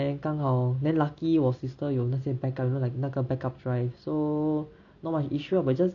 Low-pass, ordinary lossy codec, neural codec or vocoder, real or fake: 7.2 kHz; AAC, 64 kbps; none; real